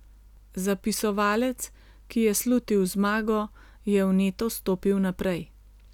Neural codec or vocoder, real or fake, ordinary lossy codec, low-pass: none; real; none; 19.8 kHz